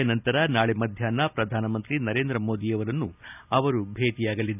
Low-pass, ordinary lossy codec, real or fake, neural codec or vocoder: 3.6 kHz; none; real; none